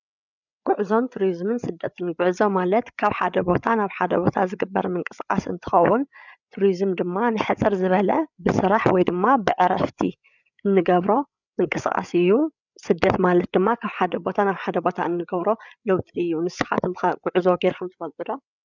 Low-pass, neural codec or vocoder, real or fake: 7.2 kHz; codec, 16 kHz, 8 kbps, FreqCodec, larger model; fake